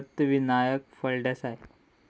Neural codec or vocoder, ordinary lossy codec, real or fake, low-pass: none; none; real; none